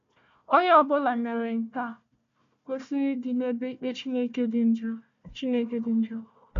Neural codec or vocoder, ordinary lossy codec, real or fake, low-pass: codec, 16 kHz, 1 kbps, FunCodec, trained on Chinese and English, 50 frames a second; MP3, 64 kbps; fake; 7.2 kHz